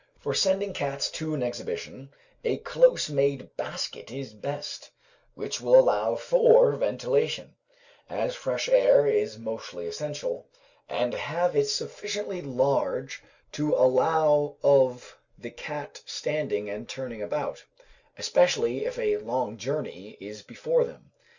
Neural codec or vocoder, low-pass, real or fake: none; 7.2 kHz; real